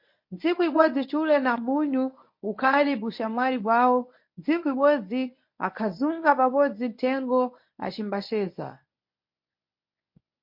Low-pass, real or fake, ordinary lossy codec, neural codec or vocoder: 5.4 kHz; fake; MP3, 32 kbps; codec, 24 kHz, 0.9 kbps, WavTokenizer, medium speech release version 1